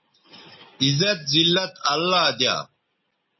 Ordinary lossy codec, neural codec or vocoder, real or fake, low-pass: MP3, 24 kbps; none; real; 7.2 kHz